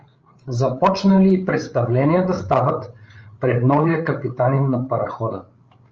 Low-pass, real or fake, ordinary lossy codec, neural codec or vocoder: 7.2 kHz; fake; Opus, 24 kbps; codec, 16 kHz, 8 kbps, FreqCodec, larger model